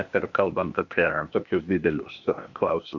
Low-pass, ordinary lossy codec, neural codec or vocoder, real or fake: 7.2 kHz; Opus, 64 kbps; codec, 16 kHz, 0.8 kbps, ZipCodec; fake